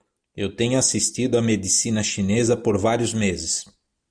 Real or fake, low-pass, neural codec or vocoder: real; 9.9 kHz; none